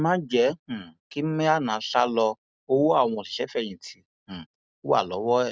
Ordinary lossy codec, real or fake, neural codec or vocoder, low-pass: none; real; none; none